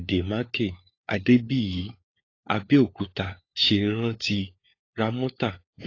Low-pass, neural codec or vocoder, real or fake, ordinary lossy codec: 7.2 kHz; codec, 16 kHz, 4 kbps, FunCodec, trained on LibriTTS, 50 frames a second; fake; AAC, 32 kbps